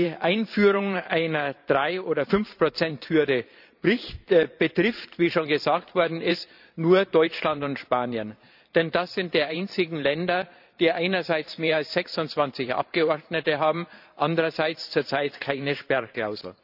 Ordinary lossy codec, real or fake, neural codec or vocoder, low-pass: none; fake; vocoder, 44.1 kHz, 128 mel bands every 512 samples, BigVGAN v2; 5.4 kHz